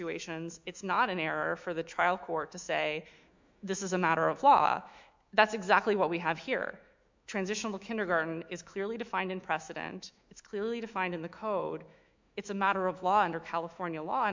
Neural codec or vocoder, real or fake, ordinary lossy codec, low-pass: autoencoder, 48 kHz, 128 numbers a frame, DAC-VAE, trained on Japanese speech; fake; MP3, 64 kbps; 7.2 kHz